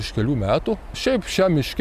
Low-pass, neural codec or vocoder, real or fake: 14.4 kHz; none; real